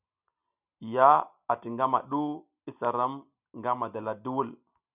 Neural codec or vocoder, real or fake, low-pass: none; real; 3.6 kHz